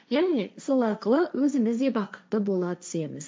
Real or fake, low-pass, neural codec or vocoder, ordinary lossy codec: fake; none; codec, 16 kHz, 1.1 kbps, Voila-Tokenizer; none